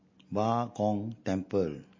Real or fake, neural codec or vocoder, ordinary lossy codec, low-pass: real; none; MP3, 32 kbps; 7.2 kHz